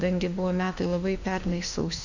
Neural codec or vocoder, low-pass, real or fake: autoencoder, 48 kHz, 32 numbers a frame, DAC-VAE, trained on Japanese speech; 7.2 kHz; fake